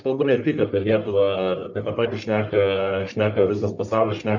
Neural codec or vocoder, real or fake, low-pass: codec, 44.1 kHz, 1.7 kbps, Pupu-Codec; fake; 7.2 kHz